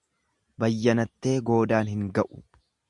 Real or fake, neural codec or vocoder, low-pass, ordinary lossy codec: real; none; 10.8 kHz; Opus, 64 kbps